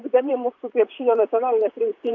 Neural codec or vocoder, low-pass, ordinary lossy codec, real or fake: vocoder, 44.1 kHz, 128 mel bands, Pupu-Vocoder; 7.2 kHz; AAC, 48 kbps; fake